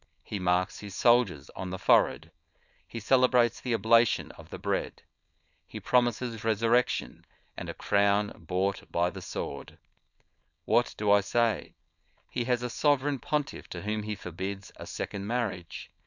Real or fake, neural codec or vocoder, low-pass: fake; codec, 16 kHz, 4.8 kbps, FACodec; 7.2 kHz